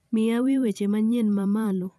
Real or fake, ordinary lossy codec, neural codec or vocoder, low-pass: fake; none; vocoder, 44.1 kHz, 128 mel bands every 512 samples, BigVGAN v2; 14.4 kHz